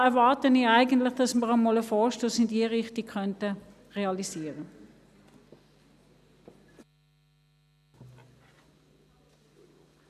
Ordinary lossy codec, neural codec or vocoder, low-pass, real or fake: none; none; 14.4 kHz; real